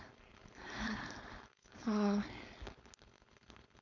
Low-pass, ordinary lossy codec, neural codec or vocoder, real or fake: 7.2 kHz; none; codec, 16 kHz, 4.8 kbps, FACodec; fake